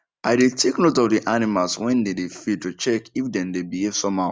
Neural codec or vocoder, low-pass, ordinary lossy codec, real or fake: none; none; none; real